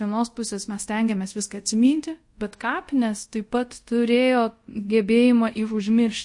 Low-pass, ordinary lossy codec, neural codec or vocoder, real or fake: 10.8 kHz; MP3, 48 kbps; codec, 24 kHz, 0.5 kbps, DualCodec; fake